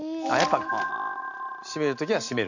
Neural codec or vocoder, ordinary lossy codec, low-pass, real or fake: none; none; 7.2 kHz; real